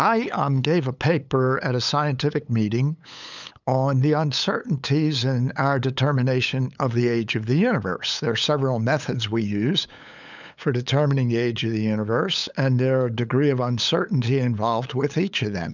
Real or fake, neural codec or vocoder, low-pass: fake; codec, 16 kHz, 8 kbps, FunCodec, trained on LibriTTS, 25 frames a second; 7.2 kHz